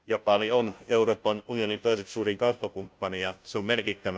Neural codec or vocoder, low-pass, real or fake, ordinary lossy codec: codec, 16 kHz, 0.5 kbps, FunCodec, trained on Chinese and English, 25 frames a second; none; fake; none